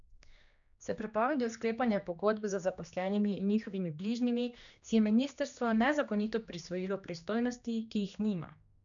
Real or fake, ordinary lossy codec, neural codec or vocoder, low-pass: fake; none; codec, 16 kHz, 2 kbps, X-Codec, HuBERT features, trained on general audio; 7.2 kHz